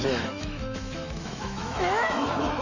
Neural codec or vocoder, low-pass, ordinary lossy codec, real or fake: autoencoder, 48 kHz, 128 numbers a frame, DAC-VAE, trained on Japanese speech; 7.2 kHz; none; fake